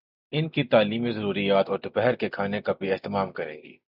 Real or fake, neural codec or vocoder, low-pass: real; none; 5.4 kHz